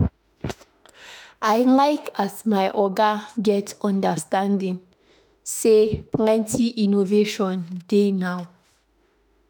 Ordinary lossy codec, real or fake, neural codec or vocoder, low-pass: none; fake; autoencoder, 48 kHz, 32 numbers a frame, DAC-VAE, trained on Japanese speech; none